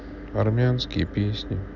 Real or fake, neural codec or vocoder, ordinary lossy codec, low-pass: real; none; none; 7.2 kHz